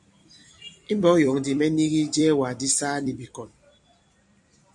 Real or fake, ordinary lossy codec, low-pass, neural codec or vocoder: fake; MP3, 64 kbps; 10.8 kHz; vocoder, 44.1 kHz, 128 mel bands every 256 samples, BigVGAN v2